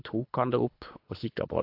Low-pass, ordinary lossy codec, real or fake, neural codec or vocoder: 5.4 kHz; AAC, 32 kbps; fake; codec, 24 kHz, 3 kbps, HILCodec